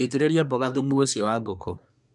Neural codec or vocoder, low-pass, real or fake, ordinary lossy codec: codec, 24 kHz, 1 kbps, SNAC; 10.8 kHz; fake; MP3, 96 kbps